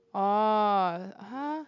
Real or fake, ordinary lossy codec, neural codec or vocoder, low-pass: real; none; none; 7.2 kHz